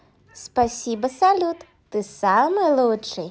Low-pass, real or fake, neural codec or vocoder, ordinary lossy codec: none; real; none; none